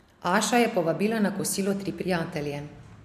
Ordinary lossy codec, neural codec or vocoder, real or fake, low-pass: MP3, 96 kbps; none; real; 14.4 kHz